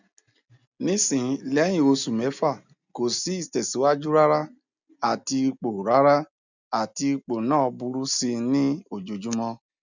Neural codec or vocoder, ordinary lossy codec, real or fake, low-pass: none; none; real; 7.2 kHz